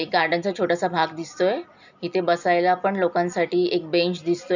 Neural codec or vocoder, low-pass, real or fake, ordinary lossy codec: none; 7.2 kHz; real; none